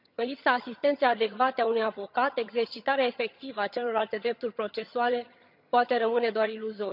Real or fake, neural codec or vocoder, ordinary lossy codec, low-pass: fake; vocoder, 22.05 kHz, 80 mel bands, HiFi-GAN; none; 5.4 kHz